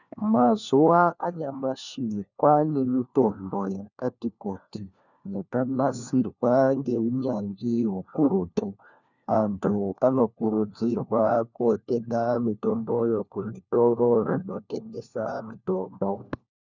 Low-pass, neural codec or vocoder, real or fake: 7.2 kHz; codec, 16 kHz, 1 kbps, FunCodec, trained on LibriTTS, 50 frames a second; fake